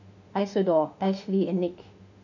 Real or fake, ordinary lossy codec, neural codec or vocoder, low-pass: fake; none; autoencoder, 48 kHz, 32 numbers a frame, DAC-VAE, trained on Japanese speech; 7.2 kHz